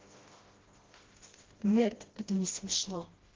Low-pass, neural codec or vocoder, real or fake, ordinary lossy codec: 7.2 kHz; codec, 16 kHz, 1 kbps, FreqCodec, smaller model; fake; Opus, 16 kbps